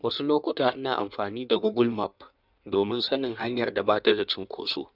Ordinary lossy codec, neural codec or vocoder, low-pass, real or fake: none; codec, 16 kHz in and 24 kHz out, 1.1 kbps, FireRedTTS-2 codec; 5.4 kHz; fake